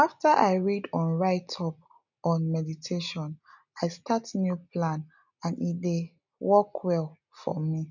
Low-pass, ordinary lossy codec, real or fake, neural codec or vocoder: 7.2 kHz; none; real; none